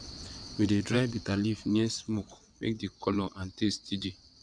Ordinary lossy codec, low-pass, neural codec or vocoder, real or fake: none; 9.9 kHz; vocoder, 22.05 kHz, 80 mel bands, WaveNeXt; fake